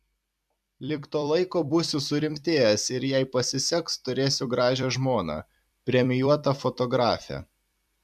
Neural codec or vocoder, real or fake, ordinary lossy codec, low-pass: vocoder, 44.1 kHz, 128 mel bands every 256 samples, BigVGAN v2; fake; MP3, 96 kbps; 14.4 kHz